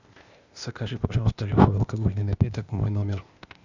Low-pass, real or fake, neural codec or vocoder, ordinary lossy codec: 7.2 kHz; fake; codec, 16 kHz, 0.8 kbps, ZipCodec; Opus, 64 kbps